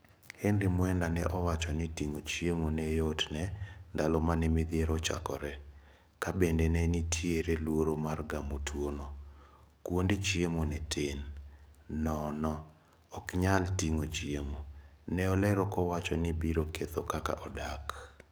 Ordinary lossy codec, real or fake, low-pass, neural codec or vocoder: none; fake; none; codec, 44.1 kHz, 7.8 kbps, DAC